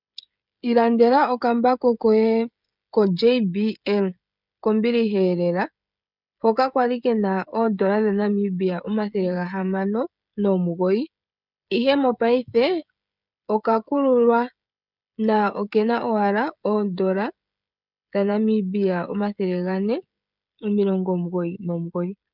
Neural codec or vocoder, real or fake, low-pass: codec, 16 kHz, 16 kbps, FreqCodec, smaller model; fake; 5.4 kHz